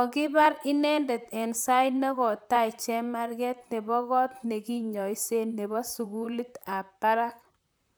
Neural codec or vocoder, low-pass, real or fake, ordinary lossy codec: vocoder, 44.1 kHz, 128 mel bands, Pupu-Vocoder; none; fake; none